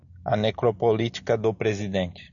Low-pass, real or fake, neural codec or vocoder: 7.2 kHz; real; none